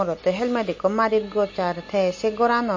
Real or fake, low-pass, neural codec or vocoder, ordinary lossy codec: real; 7.2 kHz; none; MP3, 32 kbps